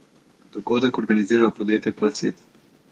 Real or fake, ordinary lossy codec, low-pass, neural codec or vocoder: fake; Opus, 32 kbps; 14.4 kHz; codec, 32 kHz, 1.9 kbps, SNAC